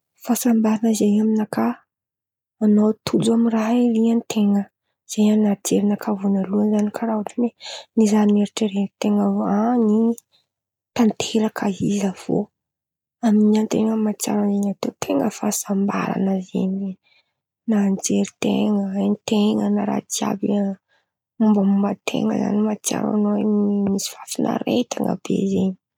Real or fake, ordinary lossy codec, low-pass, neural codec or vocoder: real; none; 19.8 kHz; none